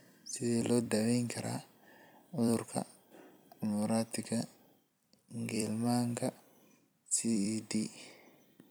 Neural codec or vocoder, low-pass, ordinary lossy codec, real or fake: vocoder, 44.1 kHz, 128 mel bands every 256 samples, BigVGAN v2; none; none; fake